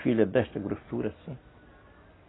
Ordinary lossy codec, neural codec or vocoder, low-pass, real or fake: AAC, 16 kbps; none; 7.2 kHz; real